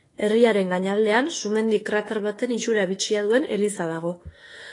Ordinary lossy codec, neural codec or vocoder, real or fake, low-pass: AAC, 32 kbps; autoencoder, 48 kHz, 32 numbers a frame, DAC-VAE, trained on Japanese speech; fake; 10.8 kHz